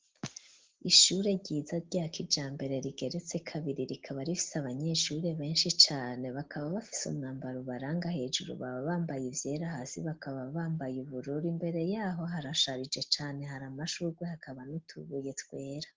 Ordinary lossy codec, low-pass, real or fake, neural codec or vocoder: Opus, 16 kbps; 7.2 kHz; real; none